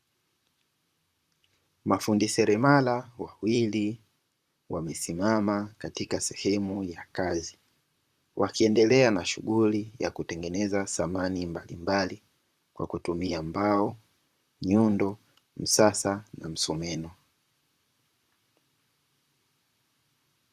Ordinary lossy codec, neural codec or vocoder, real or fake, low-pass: Opus, 64 kbps; vocoder, 44.1 kHz, 128 mel bands, Pupu-Vocoder; fake; 14.4 kHz